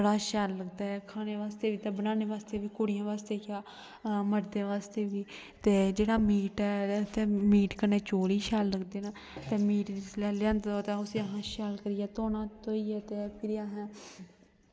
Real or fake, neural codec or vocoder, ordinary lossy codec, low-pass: real; none; none; none